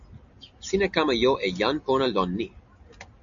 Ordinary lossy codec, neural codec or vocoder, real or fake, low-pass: AAC, 64 kbps; none; real; 7.2 kHz